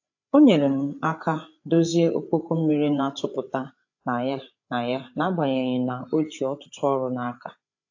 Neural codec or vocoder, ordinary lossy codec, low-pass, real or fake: codec, 16 kHz, 8 kbps, FreqCodec, larger model; AAC, 48 kbps; 7.2 kHz; fake